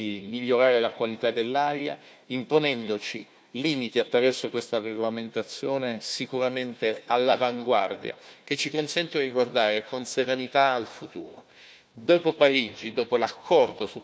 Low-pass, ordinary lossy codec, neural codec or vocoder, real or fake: none; none; codec, 16 kHz, 1 kbps, FunCodec, trained on Chinese and English, 50 frames a second; fake